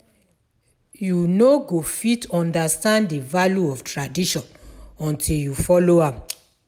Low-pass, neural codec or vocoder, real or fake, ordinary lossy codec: 19.8 kHz; none; real; none